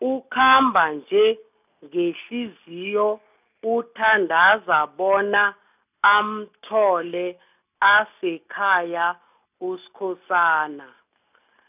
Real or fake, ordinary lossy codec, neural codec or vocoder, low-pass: real; none; none; 3.6 kHz